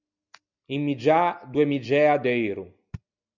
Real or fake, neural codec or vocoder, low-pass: real; none; 7.2 kHz